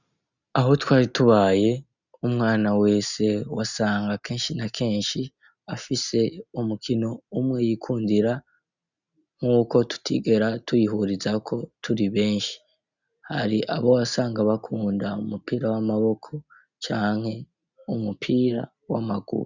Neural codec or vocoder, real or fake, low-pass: none; real; 7.2 kHz